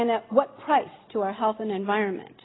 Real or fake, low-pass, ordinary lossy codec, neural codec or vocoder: real; 7.2 kHz; AAC, 16 kbps; none